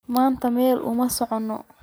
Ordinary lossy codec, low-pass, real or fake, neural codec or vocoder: none; none; real; none